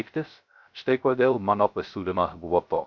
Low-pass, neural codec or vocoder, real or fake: 7.2 kHz; codec, 16 kHz, 0.3 kbps, FocalCodec; fake